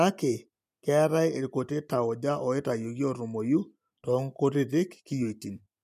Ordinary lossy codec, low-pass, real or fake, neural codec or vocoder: MP3, 96 kbps; 14.4 kHz; real; none